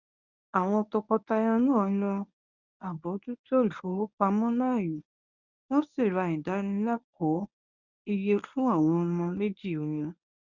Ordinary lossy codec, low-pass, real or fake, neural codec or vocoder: none; 7.2 kHz; fake; codec, 24 kHz, 0.9 kbps, WavTokenizer, medium speech release version 1